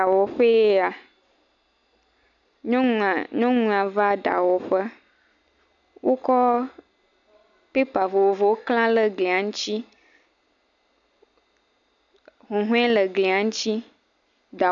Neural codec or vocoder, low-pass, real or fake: none; 7.2 kHz; real